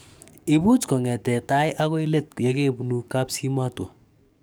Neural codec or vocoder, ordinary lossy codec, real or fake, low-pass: codec, 44.1 kHz, 7.8 kbps, DAC; none; fake; none